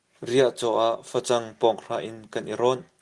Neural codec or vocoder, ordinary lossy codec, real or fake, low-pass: none; Opus, 24 kbps; real; 10.8 kHz